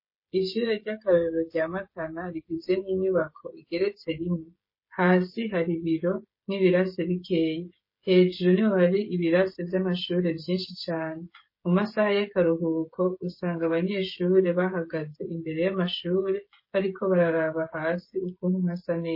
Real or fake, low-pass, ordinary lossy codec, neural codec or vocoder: fake; 5.4 kHz; MP3, 24 kbps; codec, 16 kHz, 16 kbps, FreqCodec, smaller model